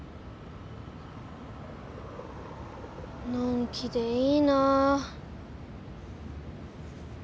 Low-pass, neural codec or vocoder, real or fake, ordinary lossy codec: none; none; real; none